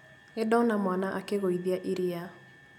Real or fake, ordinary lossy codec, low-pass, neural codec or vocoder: real; none; 19.8 kHz; none